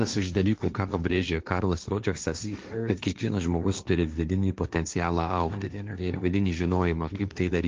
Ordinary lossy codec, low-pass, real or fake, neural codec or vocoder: Opus, 24 kbps; 7.2 kHz; fake; codec, 16 kHz, 1.1 kbps, Voila-Tokenizer